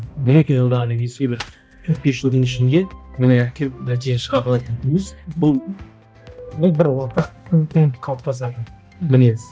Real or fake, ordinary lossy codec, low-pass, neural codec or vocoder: fake; none; none; codec, 16 kHz, 1 kbps, X-Codec, HuBERT features, trained on balanced general audio